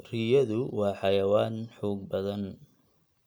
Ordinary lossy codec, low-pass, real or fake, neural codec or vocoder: none; none; real; none